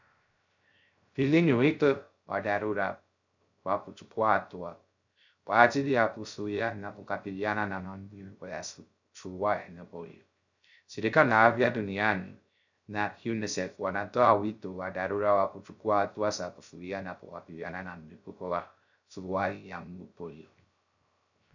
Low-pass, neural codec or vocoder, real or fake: 7.2 kHz; codec, 16 kHz, 0.3 kbps, FocalCodec; fake